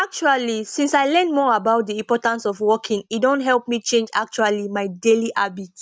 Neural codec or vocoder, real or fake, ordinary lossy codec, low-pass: none; real; none; none